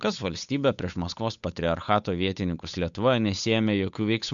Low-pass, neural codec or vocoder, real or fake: 7.2 kHz; codec, 16 kHz, 8 kbps, FunCodec, trained on Chinese and English, 25 frames a second; fake